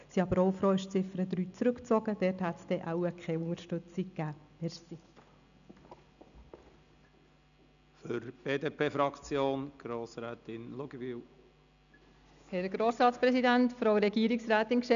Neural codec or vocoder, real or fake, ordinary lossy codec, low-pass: none; real; none; 7.2 kHz